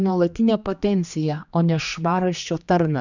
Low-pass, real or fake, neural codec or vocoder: 7.2 kHz; fake; codec, 16 kHz, 2 kbps, X-Codec, HuBERT features, trained on general audio